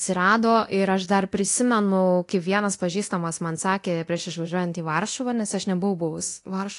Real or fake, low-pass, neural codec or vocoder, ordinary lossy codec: fake; 10.8 kHz; codec, 24 kHz, 0.9 kbps, DualCodec; AAC, 48 kbps